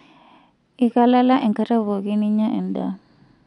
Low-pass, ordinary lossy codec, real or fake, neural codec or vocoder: 10.8 kHz; none; real; none